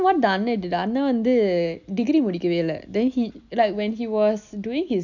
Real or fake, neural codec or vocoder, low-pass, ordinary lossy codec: real; none; 7.2 kHz; none